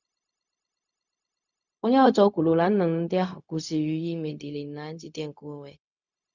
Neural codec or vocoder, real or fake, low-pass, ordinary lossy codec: codec, 16 kHz, 0.4 kbps, LongCat-Audio-Codec; fake; 7.2 kHz; none